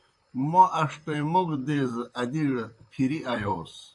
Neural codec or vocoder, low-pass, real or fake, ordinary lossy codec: vocoder, 44.1 kHz, 128 mel bands, Pupu-Vocoder; 10.8 kHz; fake; MP3, 64 kbps